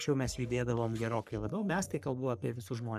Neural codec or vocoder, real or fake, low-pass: codec, 44.1 kHz, 3.4 kbps, Pupu-Codec; fake; 14.4 kHz